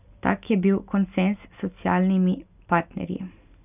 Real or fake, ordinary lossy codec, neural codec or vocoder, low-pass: real; none; none; 3.6 kHz